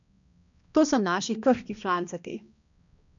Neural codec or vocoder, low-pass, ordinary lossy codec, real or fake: codec, 16 kHz, 1 kbps, X-Codec, HuBERT features, trained on balanced general audio; 7.2 kHz; none; fake